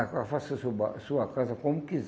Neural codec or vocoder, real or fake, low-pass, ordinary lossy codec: none; real; none; none